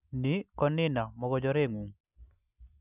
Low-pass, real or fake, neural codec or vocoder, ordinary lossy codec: 3.6 kHz; real; none; none